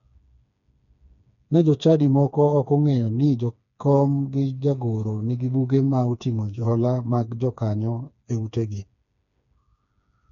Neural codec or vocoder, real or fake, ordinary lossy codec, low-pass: codec, 16 kHz, 4 kbps, FreqCodec, smaller model; fake; none; 7.2 kHz